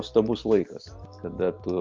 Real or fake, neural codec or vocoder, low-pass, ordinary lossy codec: real; none; 7.2 kHz; Opus, 24 kbps